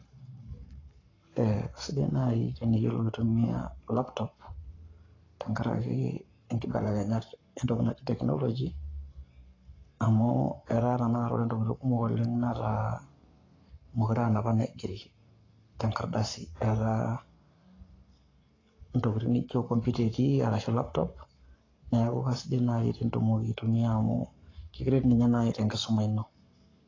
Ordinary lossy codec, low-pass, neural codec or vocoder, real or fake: AAC, 32 kbps; 7.2 kHz; codec, 44.1 kHz, 7.8 kbps, Pupu-Codec; fake